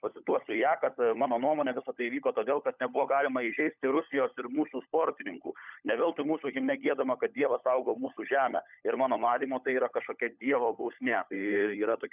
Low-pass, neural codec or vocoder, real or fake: 3.6 kHz; codec, 16 kHz, 16 kbps, FunCodec, trained on LibriTTS, 50 frames a second; fake